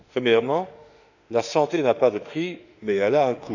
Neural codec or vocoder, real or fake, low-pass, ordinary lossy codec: autoencoder, 48 kHz, 32 numbers a frame, DAC-VAE, trained on Japanese speech; fake; 7.2 kHz; none